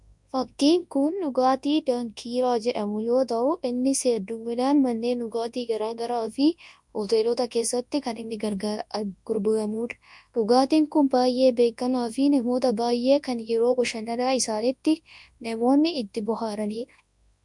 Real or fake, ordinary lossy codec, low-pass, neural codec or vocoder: fake; MP3, 64 kbps; 10.8 kHz; codec, 24 kHz, 0.9 kbps, WavTokenizer, large speech release